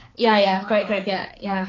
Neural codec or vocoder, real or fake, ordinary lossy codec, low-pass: codec, 16 kHz, 4 kbps, X-Codec, HuBERT features, trained on balanced general audio; fake; AAC, 32 kbps; 7.2 kHz